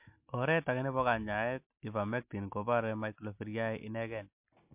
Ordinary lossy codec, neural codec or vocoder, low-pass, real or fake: MP3, 32 kbps; none; 3.6 kHz; real